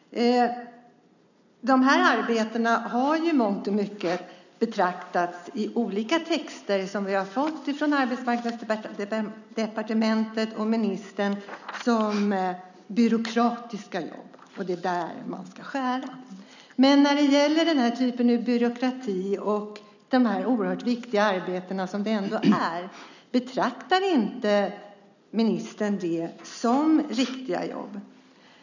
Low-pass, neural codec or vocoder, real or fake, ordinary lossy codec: 7.2 kHz; none; real; none